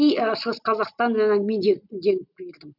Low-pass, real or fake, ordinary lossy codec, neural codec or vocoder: 5.4 kHz; real; none; none